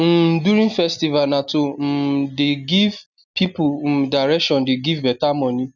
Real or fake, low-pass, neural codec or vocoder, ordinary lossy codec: real; 7.2 kHz; none; none